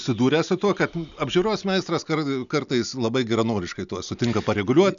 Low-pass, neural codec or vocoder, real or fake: 7.2 kHz; none; real